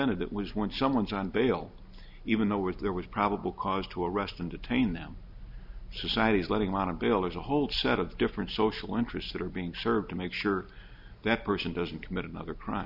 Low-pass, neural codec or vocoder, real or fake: 5.4 kHz; none; real